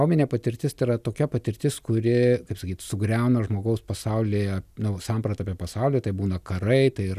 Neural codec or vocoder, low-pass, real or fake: none; 14.4 kHz; real